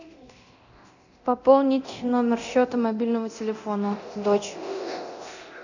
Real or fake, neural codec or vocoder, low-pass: fake; codec, 24 kHz, 0.9 kbps, DualCodec; 7.2 kHz